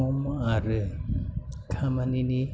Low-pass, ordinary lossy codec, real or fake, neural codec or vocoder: none; none; real; none